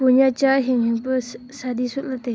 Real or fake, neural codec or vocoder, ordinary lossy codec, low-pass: real; none; none; none